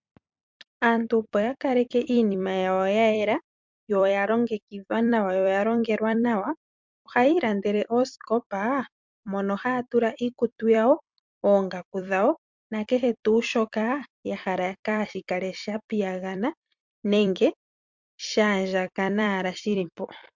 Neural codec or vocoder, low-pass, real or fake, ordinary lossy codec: vocoder, 44.1 kHz, 128 mel bands every 256 samples, BigVGAN v2; 7.2 kHz; fake; MP3, 64 kbps